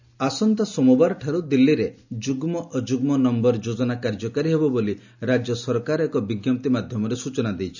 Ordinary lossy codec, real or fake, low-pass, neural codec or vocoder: none; real; 7.2 kHz; none